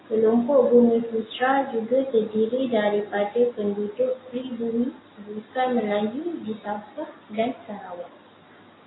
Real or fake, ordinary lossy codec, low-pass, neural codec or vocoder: real; AAC, 16 kbps; 7.2 kHz; none